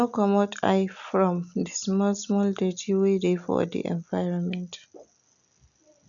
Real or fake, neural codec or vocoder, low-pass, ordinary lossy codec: real; none; 7.2 kHz; none